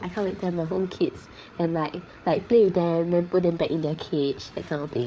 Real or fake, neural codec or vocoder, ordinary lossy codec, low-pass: fake; codec, 16 kHz, 8 kbps, FreqCodec, larger model; none; none